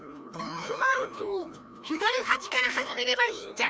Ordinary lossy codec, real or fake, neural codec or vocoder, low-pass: none; fake; codec, 16 kHz, 1 kbps, FreqCodec, larger model; none